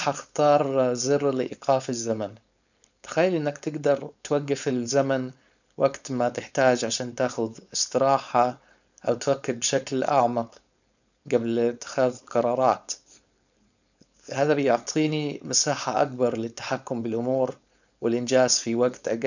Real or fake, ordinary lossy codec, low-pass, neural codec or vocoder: fake; none; 7.2 kHz; codec, 16 kHz, 4.8 kbps, FACodec